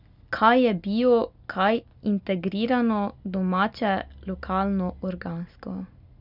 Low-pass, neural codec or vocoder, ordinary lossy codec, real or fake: 5.4 kHz; none; none; real